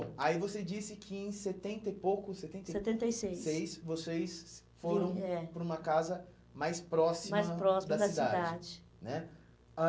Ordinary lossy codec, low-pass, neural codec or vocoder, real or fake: none; none; none; real